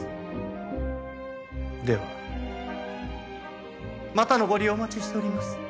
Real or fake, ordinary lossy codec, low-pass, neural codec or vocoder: real; none; none; none